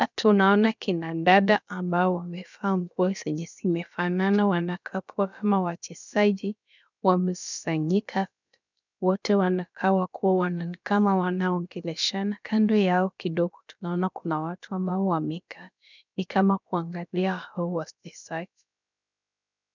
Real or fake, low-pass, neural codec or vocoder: fake; 7.2 kHz; codec, 16 kHz, about 1 kbps, DyCAST, with the encoder's durations